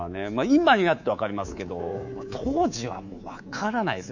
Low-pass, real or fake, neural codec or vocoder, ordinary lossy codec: 7.2 kHz; fake; codec, 24 kHz, 3.1 kbps, DualCodec; none